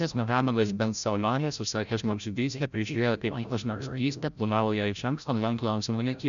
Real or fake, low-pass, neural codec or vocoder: fake; 7.2 kHz; codec, 16 kHz, 0.5 kbps, FreqCodec, larger model